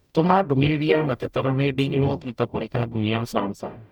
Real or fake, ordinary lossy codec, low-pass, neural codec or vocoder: fake; none; 19.8 kHz; codec, 44.1 kHz, 0.9 kbps, DAC